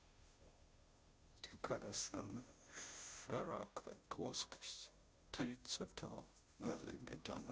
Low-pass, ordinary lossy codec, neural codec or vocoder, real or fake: none; none; codec, 16 kHz, 0.5 kbps, FunCodec, trained on Chinese and English, 25 frames a second; fake